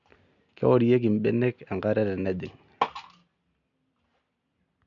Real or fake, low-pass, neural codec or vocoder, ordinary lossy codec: real; 7.2 kHz; none; none